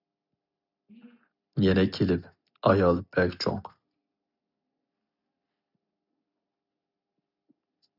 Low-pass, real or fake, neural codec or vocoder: 5.4 kHz; real; none